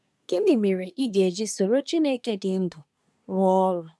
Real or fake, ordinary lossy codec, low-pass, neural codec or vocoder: fake; none; none; codec, 24 kHz, 1 kbps, SNAC